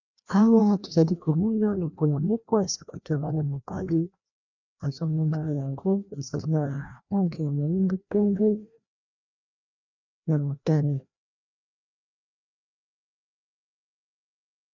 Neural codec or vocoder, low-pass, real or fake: codec, 16 kHz, 1 kbps, FreqCodec, larger model; 7.2 kHz; fake